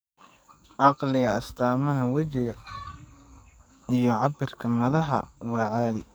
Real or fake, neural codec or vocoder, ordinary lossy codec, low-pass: fake; codec, 44.1 kHz, 2.6 kbps, SNAC; none; none